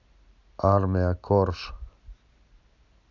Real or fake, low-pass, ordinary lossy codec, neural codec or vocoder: real; 7.2 kHz; none; none